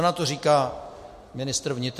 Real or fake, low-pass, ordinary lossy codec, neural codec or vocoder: real; 14.4 kHz; MP3, 64 kbps; none